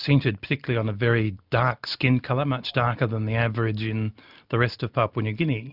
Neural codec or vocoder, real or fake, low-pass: none; real; 5.4 kHz